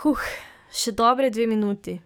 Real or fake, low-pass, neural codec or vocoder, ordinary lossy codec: fake; none; codec, 44.1 kHz, 7.8 kbps, DAC; none